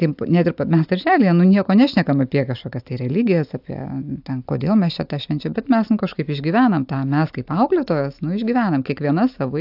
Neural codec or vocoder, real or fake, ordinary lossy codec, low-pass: none; real; AAC, 48 kbps; 5.4 kHz